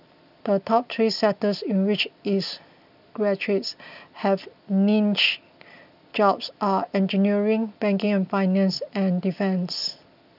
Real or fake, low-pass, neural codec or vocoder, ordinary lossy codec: real; 5.4 kHz; none; none